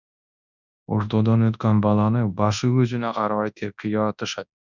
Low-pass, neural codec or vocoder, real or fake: 7.2 kHz; codec, 24 kHz, 0.9 kbps, WavTokenizer, large speech release; fake